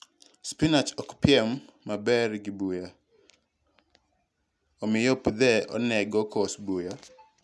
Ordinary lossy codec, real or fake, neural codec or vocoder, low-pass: none; real; none; none